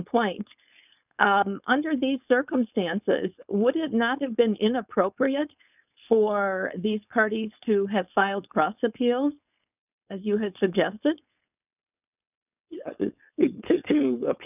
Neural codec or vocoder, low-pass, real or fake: codec, 16 kHz, 4.8 kbps, FACodec; 3.6 kHz; fake